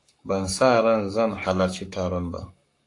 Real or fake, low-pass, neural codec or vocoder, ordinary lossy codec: fake; 10.8 kHz; codec, 44.1 kHz, 7.8 kbps, Pupu-Codec; AAC, 48 kbps